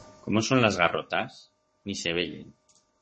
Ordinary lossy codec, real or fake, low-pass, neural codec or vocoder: MP3, 32 kbps; fake; 10.8 kHz; codec, 44.1 kHz, 7.8 kbps, Pupu-Codec